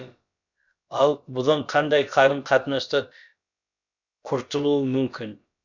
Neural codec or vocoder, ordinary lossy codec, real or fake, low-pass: codec, 16 kHz, about 1 kbps, DyCAST, with the encoder's durations; none; fake; 7.2 kHz